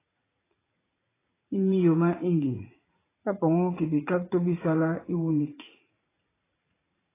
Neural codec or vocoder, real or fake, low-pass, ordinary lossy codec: vocoder, 44.1 kHz, 80 mel bands, Vocos; fake; 3.6 kHz; AAC, 16 kbps